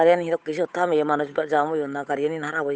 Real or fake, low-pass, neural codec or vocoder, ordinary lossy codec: fake; none; codec, 16 kHz, 8 kbps, FunCodec, trained on Chinese and English, 25 frames a second; none